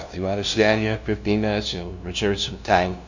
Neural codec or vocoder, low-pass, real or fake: codec, 16 kHz, 0.5 kbps, FunCodec, trained on LibriTTS, 25 frames a second; 7.2 kHz; fake